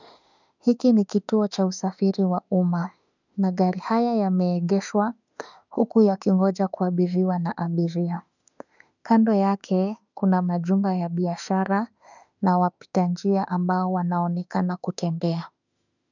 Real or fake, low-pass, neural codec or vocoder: fake; 7.2 kHz; autoencoder, 48 kHz, 32 numbers a frame, DAC-VAE, trained on Japanese speech